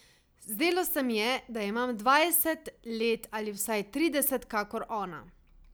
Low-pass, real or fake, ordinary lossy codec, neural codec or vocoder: none; real; none; none